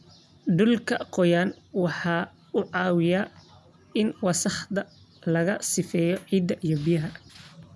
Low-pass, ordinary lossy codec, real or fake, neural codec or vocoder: none; none; real; none